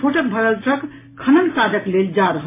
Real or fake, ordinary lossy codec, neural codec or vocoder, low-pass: real; MP3, 16 kbps; none; 3.6 kHz